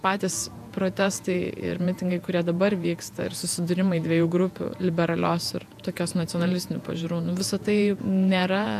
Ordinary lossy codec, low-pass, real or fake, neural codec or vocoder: AAC, 96 kbps; 14.4 kHz; fake; vocoder, 48 kHz, 128 mel bands, Vocos